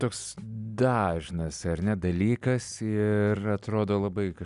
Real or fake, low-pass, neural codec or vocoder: real; 10.8 kHz; none